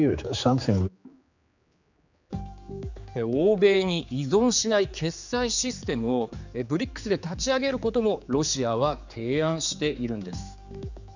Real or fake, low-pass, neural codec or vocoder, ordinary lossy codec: fake; 7.2 kHz; codec, 16 kHz, 4 kbps, X-Codec, HuBERT features, trained on general audio; none